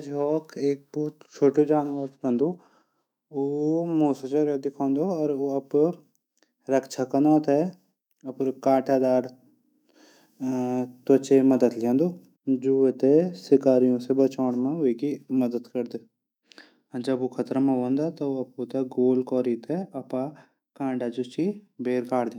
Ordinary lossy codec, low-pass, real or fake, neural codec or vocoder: none; 19.8 kHz; fake; autoencoder, 48 kHz, 128 numbers a frame, DAC-VAE, trained on Japanese speech